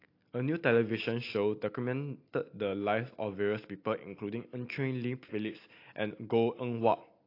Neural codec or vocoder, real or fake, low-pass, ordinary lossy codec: none; real; 5.4 kHz; AAC, 32 kbps